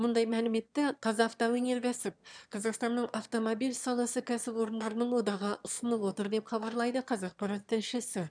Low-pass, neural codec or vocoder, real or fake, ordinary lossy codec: 9.9 kHz; autoencoder, 22.05 kHz, a latent of 192 numbers a frame, VITS, trained on one speaker; fake; none